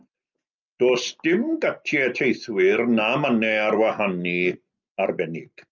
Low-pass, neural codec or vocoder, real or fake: 7.2 kHz; none; real